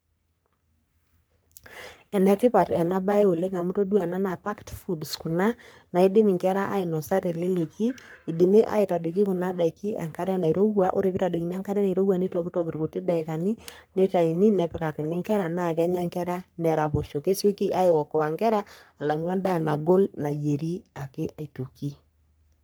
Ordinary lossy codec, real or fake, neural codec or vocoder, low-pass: none; fake; codec, 44.1 kHz, 3.4 kbps, Pupu-Codec; none